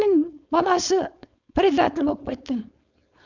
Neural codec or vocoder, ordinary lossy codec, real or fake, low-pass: codec, 16 kHz, 4.8 kbps, FACodec; none; fake; 7.2 kHz